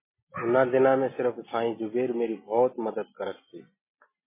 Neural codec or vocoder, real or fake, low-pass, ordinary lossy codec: none; real; 3.6 kHz; MP3, 16 kbps